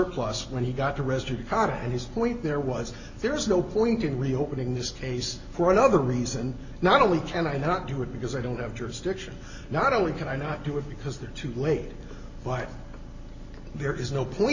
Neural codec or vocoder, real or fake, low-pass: none; real; 7.2 kHz